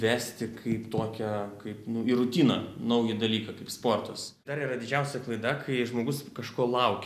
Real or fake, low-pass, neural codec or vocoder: real; 14.4 kHz; none